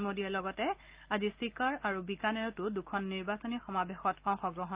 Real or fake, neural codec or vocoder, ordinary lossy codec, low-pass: real; none; Opus, 32 kbps; 3.6 kHz